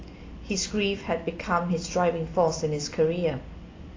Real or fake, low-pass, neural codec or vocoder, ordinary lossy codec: real; 7.2 kHz; none; AAC, 32 kbps